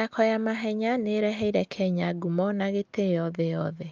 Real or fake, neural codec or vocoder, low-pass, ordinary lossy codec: real; none; 7.2 kHz; Opus, 24 kbps